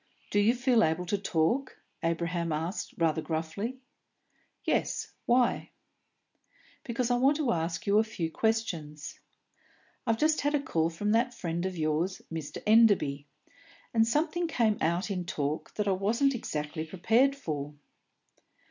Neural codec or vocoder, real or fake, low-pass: none; real; 7.2 kHz